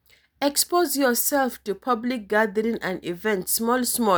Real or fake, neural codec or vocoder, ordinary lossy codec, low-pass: real; none; none; none